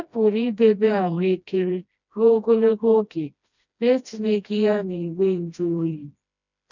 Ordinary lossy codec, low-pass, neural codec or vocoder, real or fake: none; 7.2 kHz; codec, 16 kHz, 1 kbps, FreqCodec, smaller model; fake